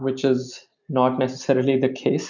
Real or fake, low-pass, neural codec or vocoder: real; 7.2 kHz; none